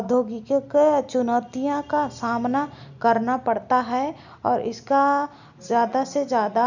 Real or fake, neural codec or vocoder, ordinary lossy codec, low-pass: real; none; none; 7.2 kHz